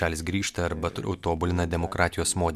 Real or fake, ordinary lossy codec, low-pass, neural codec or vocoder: real; MP3, 96 kbps; 14.4 kHz; none